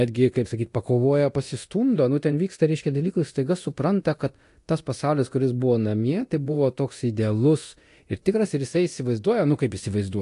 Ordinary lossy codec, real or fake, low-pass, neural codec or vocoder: AAC, 48 kbps; fake; 10.8 kHz; codec, 24 kHz, 0.9 kbps, DualCodec